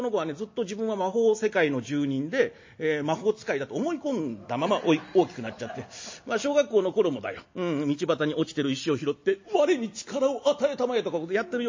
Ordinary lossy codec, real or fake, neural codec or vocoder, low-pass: MP3, 32 kbps; real; none; 7.2 kHz